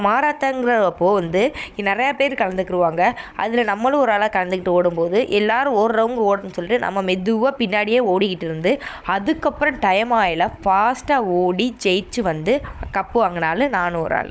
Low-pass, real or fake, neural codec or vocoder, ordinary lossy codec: none; fake; codec, 16 kHz, 16 kbps, FunCodec, trained on Chinese and English, 50 frames a second; none